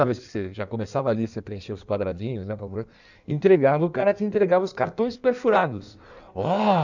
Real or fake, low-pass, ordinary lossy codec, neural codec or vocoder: fake; 7.2 kHz; none; codec, 16 kHz in and 24 kHz out, 1.1 kbps, FireRedTTS-2 codec